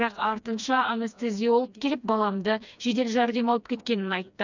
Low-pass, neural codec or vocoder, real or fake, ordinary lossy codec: 7.2 kHz; codec, 16 kHz, 2 kbps, FreqCodec, smaller model; fake; none